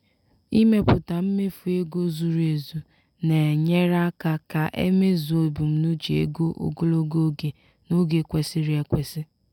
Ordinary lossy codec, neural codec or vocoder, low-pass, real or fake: none; none; 19.8 kHz; real